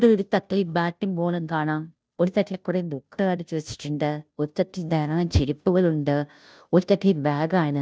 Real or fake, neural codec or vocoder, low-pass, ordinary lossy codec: fake; codec, 16 kHz, 0.5 kbps, FunCodec, trained on Chinese and English, 25 frames a second; none; none